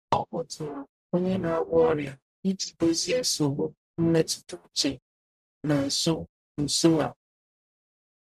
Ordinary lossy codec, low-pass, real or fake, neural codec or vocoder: none; 14.4 kHz; fake; codec, 44.1 kHz, 0.9 kbps, DAC